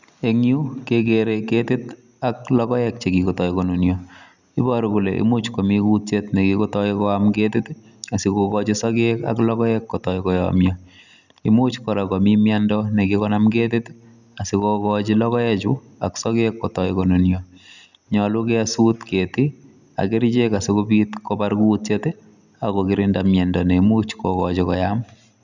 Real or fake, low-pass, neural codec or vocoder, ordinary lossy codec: real; 7.2 kHz; none; none